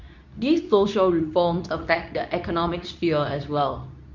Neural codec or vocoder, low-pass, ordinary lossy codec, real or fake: codec, 24 kHz, 0.9 kbps, WavTokenizer, medium speech release version 2; 7.2 kHz; none; fake